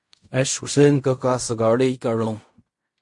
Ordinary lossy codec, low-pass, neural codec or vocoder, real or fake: MP3, 48 kbps; 10.8 kHz; codec, 16 kHz in and 24 kHz out, 0.4 kbps, LongCat-Audio-Codec, fine tuned four codebook decoder; fake